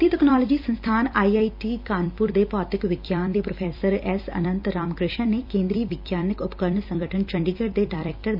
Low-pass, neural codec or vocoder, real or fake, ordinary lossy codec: 5.4 kHz; vocoder, 44.1 kHz, 128 mel bands every 256 samples, BigVGAN v2; fake; MP3, 48 kbps